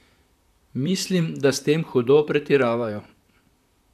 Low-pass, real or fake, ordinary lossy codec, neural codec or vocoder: 14.4 kHz; fake; none; vocoder, 44.1 kHz, 128 mel bands, Pupu-Vocoder